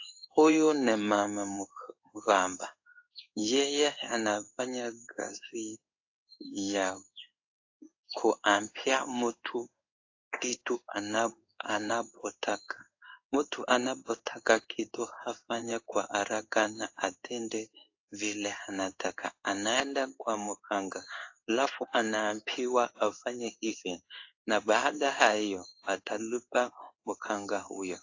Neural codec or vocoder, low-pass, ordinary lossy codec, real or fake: codec, 16 kHz in and 24 kHz out, 1 kbps, XY-Tokenizer; 7.2 kHz; AAC, 48 kbps; fake